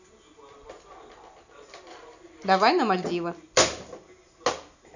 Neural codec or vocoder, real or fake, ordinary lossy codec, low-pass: none; real; none; 7.2 kHz